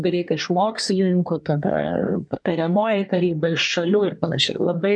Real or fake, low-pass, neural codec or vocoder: fake; 9.9 kHz; codec, 24 kHz, 1 kbps, SNAC